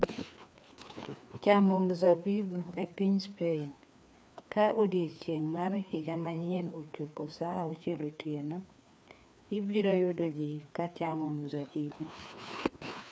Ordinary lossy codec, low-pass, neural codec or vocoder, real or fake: none; none; codec, 16 kHz, 2 kbps, FreqCodec, larger model; fake